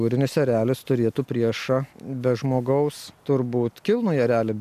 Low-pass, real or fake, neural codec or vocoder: 14.4 kHz; real; none